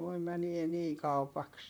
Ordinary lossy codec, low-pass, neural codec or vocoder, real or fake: none; none; vocoder, 44.1 kHz, 128 mel bands, Pupu-Vocoder; fake